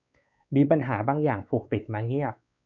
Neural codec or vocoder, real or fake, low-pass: codec, 16 kHz, 4 kbps, X-Codec, WavLM features, trained on Multilingual LibriSpeech; fake; 7.2 kHz